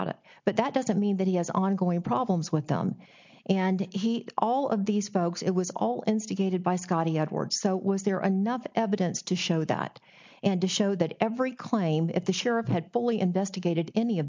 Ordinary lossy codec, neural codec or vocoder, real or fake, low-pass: MP3, 64 kbps; none; real; 7.2 kHz